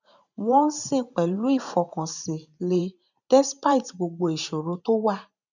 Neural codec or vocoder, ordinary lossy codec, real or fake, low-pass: vocoder, 44.1 kHz, 128 mel bands every 512 samples, BigVGAN v2; none; fake; 7.2 kHz